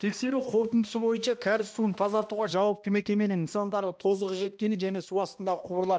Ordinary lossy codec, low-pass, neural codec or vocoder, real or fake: none; none; codec, 16 kHz, 1 kbps, X-Codec, HuBERT features, trained on balanced general audio; fake